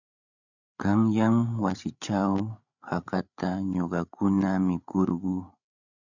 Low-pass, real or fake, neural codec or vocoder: 7.2 kHz; fake; codec, 16 kHz, 8 kbps, FreqCodec, larger model